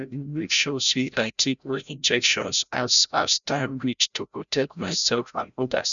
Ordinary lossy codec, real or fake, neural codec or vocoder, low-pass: none; fake; codec, 16 kHz, 0.5 kbps, FreqCodec, larger model; 7.2 kHz